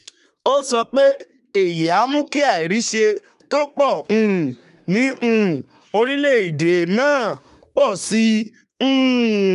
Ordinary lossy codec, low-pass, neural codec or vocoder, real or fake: none; 10.8 kHz; codec, 24 kHz, 1 kbps, SNAC; fake